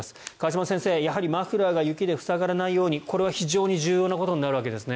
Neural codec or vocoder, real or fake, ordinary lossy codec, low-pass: none; real; none; none